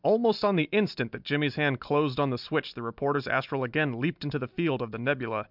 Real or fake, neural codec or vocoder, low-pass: real; none; 5.4 kHz